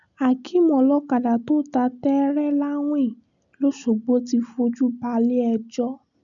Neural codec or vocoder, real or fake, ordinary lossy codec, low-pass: none; real; MP3, 96 kbps; 7.2 kHz